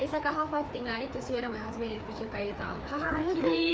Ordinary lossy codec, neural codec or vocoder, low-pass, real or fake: none; codec, 16 kHz, 4 kbps, FreqCodec, larger model; none; fake